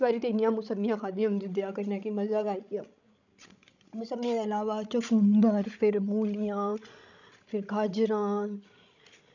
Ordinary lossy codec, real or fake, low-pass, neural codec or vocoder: none; fake; 7.2 kHz; codec, 16 kHz, 16 kbps, FreqCodec, larger model